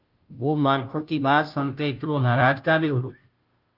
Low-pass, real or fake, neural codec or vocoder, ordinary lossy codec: 5.4 kHz; fake; codec, 16 kHz, 0.5 kbps, FunCodec, trained on Chinese and English, 25 frames a second; Opus, 32 kbps